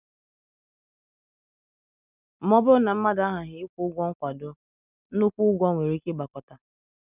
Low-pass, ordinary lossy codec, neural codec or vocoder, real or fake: 3.6 kHz; none; none; real